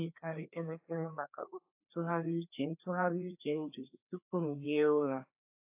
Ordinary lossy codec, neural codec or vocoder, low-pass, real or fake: AAC, 32 kbps; codec, 16 kHz, 2 kbps, FreqCodec, larger model; 3.6 kHz; fake